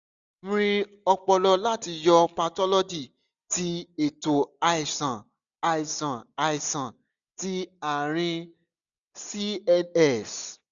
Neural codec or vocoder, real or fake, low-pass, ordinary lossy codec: none; real; 7.2 kHz; none